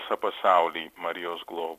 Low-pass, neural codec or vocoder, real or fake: 14.4 kHz; none; real